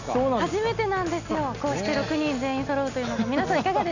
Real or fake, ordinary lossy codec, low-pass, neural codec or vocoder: real; none; 7.2 kHz; none